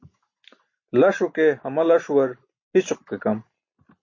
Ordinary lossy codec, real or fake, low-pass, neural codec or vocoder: MP3, 32 kbps; real; 7.2 kHz; none